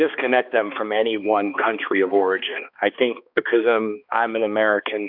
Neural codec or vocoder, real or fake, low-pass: codec, 16 kHz, 2 kbps, X-Codec, HuBERT features, trained on balanced general audio; fake; 5.4 kHz